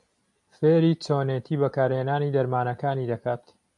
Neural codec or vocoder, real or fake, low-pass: none; real; 10.8 kHz